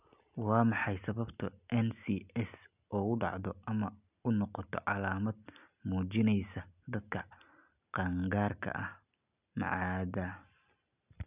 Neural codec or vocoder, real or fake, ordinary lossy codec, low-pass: none; real; none; 3.6 kHz